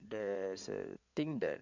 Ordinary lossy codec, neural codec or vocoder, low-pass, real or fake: none; codec, 16 kHz in and 24 kHz out, 2.2 kbps, FireRedTTS-2 codec; 7.2 kHz; fake